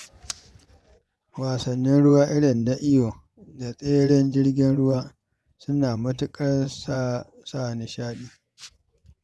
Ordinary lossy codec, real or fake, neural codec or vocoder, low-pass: none; fake; vocoder, 24 kHz, 100 mel bands, Vocos; none